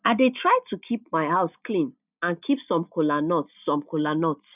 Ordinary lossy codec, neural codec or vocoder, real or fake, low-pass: none; none; real; 3.6 kHz